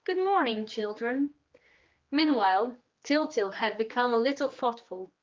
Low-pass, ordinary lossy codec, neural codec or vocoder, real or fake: 7.2 kHz; Opus, 32 kbps; codec, 16 kHz, 2 kbps, X-Codec, HuBERT features, trained on general audio; fake